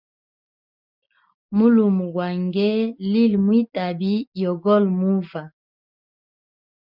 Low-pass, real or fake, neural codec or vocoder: 5.4 kHz; real; none